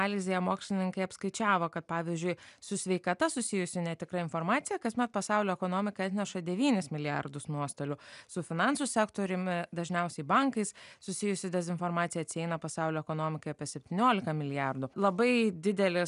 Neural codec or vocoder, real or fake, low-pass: none; real; 10.8 kHz